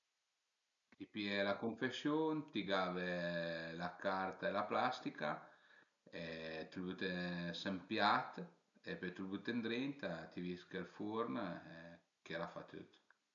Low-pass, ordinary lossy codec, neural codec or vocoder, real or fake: 7.2 kHz; none; none; real